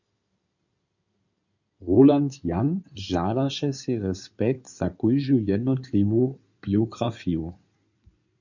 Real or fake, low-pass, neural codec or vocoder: fake; 7.2 kHz; codec, 16 kHz in and 24 kHz out, 2.2 kbps, FireRedTTS-2 codec